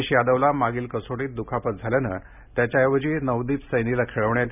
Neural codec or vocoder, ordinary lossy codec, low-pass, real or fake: none; none; 3.6 kHz; real